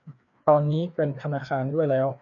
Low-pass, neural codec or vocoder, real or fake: 7.2 kHz; codec, 16 kHz, 2 kbps, FreqCodec, larger model; fake